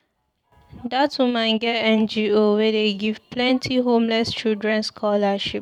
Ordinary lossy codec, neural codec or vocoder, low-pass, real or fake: none; vocoder, 44.1 kHz, 128 mel bands every 512 samples, BigVGAN v2; 19.8 kHz; fake